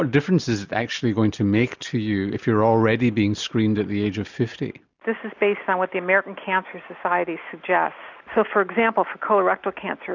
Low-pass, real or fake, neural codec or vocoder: 7.2 kHz; real; none